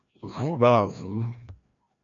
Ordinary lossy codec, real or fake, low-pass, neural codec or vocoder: MP3, 96 kbps; fake; 7.2 kHz; codec, 16 kHz, 1 kbps, FreqCodec, larger model